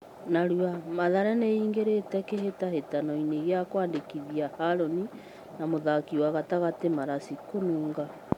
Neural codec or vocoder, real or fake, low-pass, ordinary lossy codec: none; real; 19.8 kHz; MP3, 96 kbps